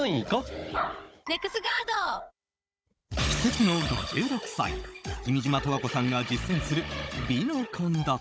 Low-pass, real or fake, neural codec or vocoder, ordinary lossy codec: none; fake; codec, 16 kHz, 16 kbps, FunCodec, trained on Chinese and English, 50 frames a second; none